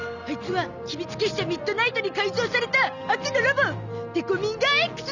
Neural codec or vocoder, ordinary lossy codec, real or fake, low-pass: none; none; real; 7.2 kHz